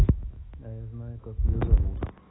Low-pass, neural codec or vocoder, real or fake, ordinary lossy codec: 7.2 kHz; autoencoder, 48 kHz, 128 numbers a frame, DAC-VAE, trained on Japanese speech; fake; AAC, 16 kbps